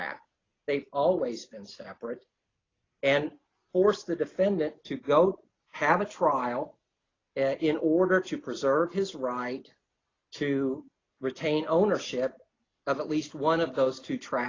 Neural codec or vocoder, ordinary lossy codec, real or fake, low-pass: none; AAC, 32 kbps; real; 7.2 kHz